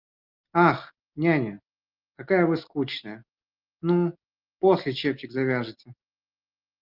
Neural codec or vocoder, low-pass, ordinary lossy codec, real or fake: none; 5.4 kHz; Opus, 24 kbps; real